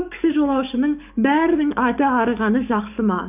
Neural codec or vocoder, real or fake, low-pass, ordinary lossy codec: codec, 44.1 kHz, 7.8 kbps, DAC; fake; 3.6 kHz; none